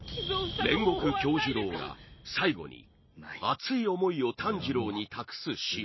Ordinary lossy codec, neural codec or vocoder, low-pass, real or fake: MP3, 24 kbps; none; 7.2 kHz; real